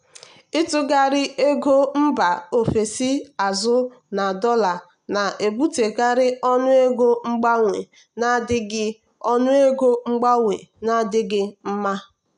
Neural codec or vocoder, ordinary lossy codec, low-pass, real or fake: none; none; 9.9 kHz; real